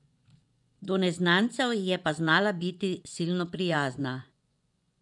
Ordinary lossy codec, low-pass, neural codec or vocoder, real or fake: none; 10.8 kHz; vocoder, 24 kHz, 100 mel bands, Vocos; fake